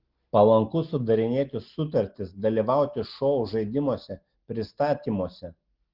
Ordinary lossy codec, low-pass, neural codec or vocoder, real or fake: Opus, 16 kbps; 5.4 kHz; none; real